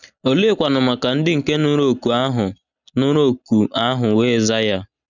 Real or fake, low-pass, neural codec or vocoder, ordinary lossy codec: real; 7.2 kHz; none; none